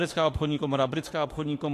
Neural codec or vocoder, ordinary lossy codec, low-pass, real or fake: autoencoder, 48 kHz, 32 numbers a frame, DAC-VAE, trained on Japanese speech; AAC, 48 kbps; 14.4 kHz; fake